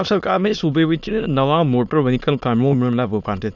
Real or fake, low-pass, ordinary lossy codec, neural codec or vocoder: fake; 7.2 kHz; none; autoencoder, 22.05 kHz, a latent of 192 numbers a frame, VITS, trained on many speakers